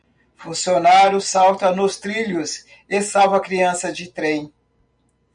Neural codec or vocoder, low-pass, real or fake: none; 9.9 kHz; real